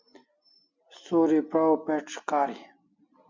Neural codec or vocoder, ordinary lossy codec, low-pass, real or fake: none; MP3, 64 kbps; 7.2 kHz; real